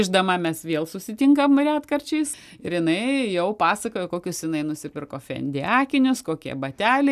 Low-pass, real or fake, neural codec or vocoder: 14.4 kHz; real; none